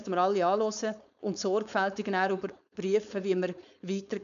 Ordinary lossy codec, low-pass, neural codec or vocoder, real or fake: none; 7.2 kHz; codec, 16 kHz, 4.8 kbps, FACodec; fake